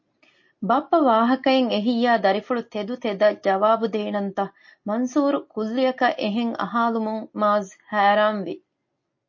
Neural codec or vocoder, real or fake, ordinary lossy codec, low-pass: none; real; MP3, 32 kbps; 7.2 kHz